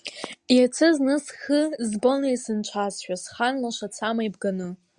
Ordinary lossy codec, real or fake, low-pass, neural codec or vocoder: Opus, 64 kbps; real; 9.9 kHz; none